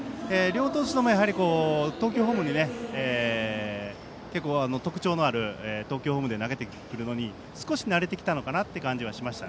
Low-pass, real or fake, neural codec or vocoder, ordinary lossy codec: none; real; none; none